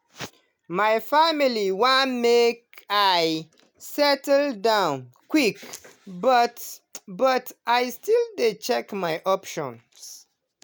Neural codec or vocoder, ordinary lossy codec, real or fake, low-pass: none; none; real; none